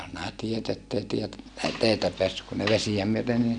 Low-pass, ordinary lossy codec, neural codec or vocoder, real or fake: 9.9 kHz; none; none; real